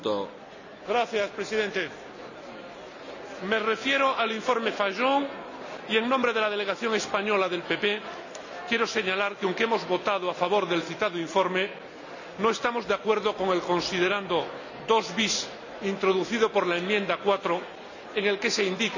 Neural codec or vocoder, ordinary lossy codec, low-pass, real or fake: none; none; 7.2 kHz; real